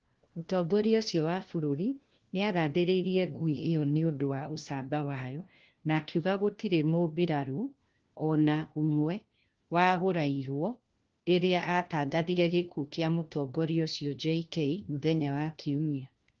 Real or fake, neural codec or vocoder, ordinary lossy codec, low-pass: fake; codec, 16 kHz, 1 kbps, FunCodec, trained on LibriTTS, 50 frames a second; Opus, 16 kbps; 7.2 kHz